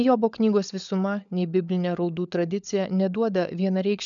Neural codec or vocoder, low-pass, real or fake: codec, 16 kHz, 16 kbps, FunCodec, trained on LibriTTS, 50 frames a second; 7.2 kHz; fake